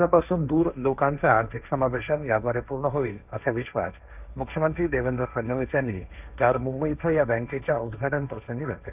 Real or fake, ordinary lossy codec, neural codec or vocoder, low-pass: fake; none; codec, 16 kHz, 1.1 kbps, Voila-Tokenizer; 3.6 kHz